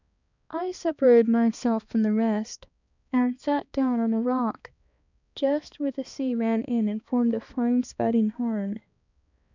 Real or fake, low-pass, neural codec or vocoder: fake; 7.2 kHz; codec, 16 kHz, 2 kbps, X-Codec, HuBERT features, trained on balanced general audio